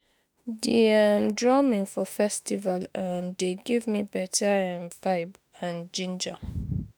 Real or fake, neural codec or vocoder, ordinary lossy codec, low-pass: fake; autoencoder, 48 kHz, 32 numbers a frame, DAC-VAE, trained on Japanese speech; none; none